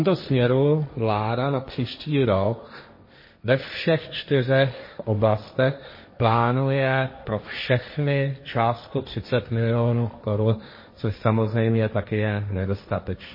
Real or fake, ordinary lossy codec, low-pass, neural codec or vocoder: fake; MP3, 24 kbps; 5.4 kHz; codec, 16 kHz, 1.1 kbps, Voila-Tokenizer